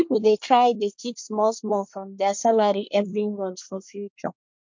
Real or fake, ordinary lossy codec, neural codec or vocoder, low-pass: fake; MP3, 48 kbps; codec, 24 kHz, 1 kbps, SNAC; 7.2 kHz